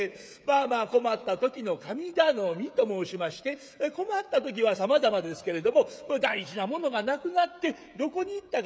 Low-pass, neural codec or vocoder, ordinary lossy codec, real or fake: none; codec, 16 kHz, 16 kbps, FreqCodec, smaller model; none; fake